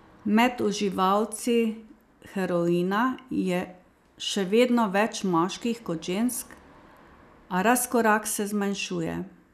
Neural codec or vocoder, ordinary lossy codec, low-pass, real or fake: none; none; 14.4 kHz; real